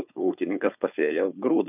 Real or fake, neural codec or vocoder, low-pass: fake; codec, 16 kHz, 4.8 kbps, FACodec; 3.6 kHz